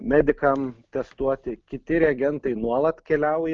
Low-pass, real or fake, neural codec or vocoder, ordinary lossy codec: 7.2 kHz; real; none; Opus, 32 kbps